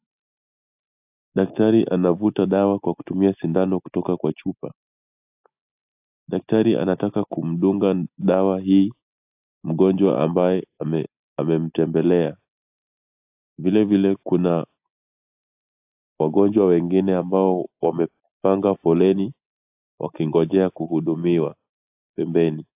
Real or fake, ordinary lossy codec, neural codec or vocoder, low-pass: real; AAC, 32 kbps; none; 3.6 kHz